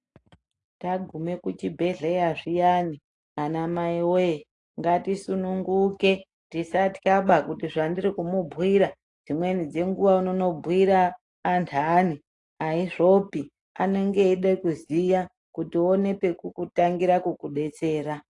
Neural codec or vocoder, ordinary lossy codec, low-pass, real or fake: none; AAC, 48 kbps; 10.8 kHz; real